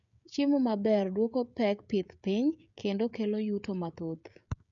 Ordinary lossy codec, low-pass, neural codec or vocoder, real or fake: none; 7.2 kHz; codec, 16 kHz, 16 kbps, FreqCodec, smaller model; fake